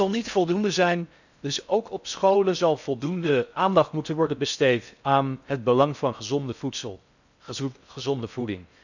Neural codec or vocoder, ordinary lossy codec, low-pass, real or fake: codec, 16 kHz in and 24 kHz out, 0.6 kbps, FocalCodec, streaming, 4096 codes; none; 7.2 kHz; fake